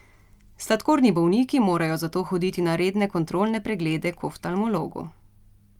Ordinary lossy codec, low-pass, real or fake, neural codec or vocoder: Opus, 32 kbps; 19.8 kHz; real; none